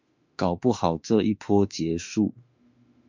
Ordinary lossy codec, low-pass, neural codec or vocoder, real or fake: MP3, 64 kbps; 7.2 kHz; autoencoder, 48 kHz, 32 numbers a frame, DAC-VAE, trained on Japanese speech; fake